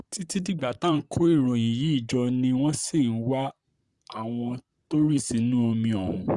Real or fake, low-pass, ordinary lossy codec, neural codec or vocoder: fake; 10.8 kHz; Opus, 64 kbps; vocoder, 44.1 kHz, 128 mel bands, Pupu-Vocoder